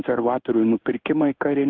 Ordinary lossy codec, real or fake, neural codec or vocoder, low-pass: Opus, 24 kbps; fake; codec, 16 kHz in and 24 kHz out, 1 kbps, XY-Tokenizer; 7.2 kHz